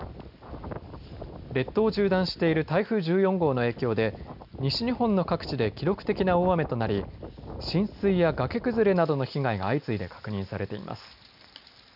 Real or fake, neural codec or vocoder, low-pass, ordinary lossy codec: real; none; 5.4 kHz; none